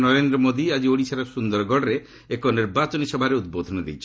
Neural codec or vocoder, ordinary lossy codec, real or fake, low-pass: none; none; real; none